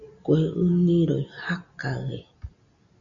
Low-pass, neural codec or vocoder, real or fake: 7.2 kHz; none; real